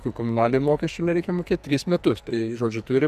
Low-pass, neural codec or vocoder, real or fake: 14.4 kHz; codec, 44.1 kHz, 2.6 kbps, SNAC; fake